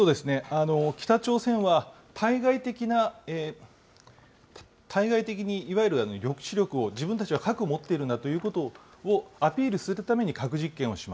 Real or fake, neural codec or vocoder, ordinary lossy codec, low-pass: real; none; none; none